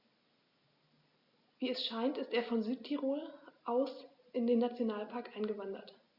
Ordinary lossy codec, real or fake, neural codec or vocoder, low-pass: Opus, 64 kbps; real; none; 5.4 kHz